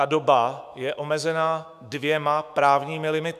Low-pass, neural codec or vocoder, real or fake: 14.4 kHz; autoencoder, 48 kHz, 128 numbers a frame, DAC-VAE, trained on Japanese speech; fake